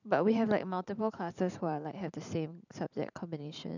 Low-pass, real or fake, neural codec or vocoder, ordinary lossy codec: 7.2 kHz; fake; vocoder, 44.1 kHz, 128 mel bands every 512 samples, BigVGAN v2; none